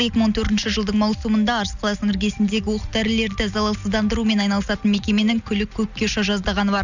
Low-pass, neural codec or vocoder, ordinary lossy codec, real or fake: 7.2 kHz; none; none; real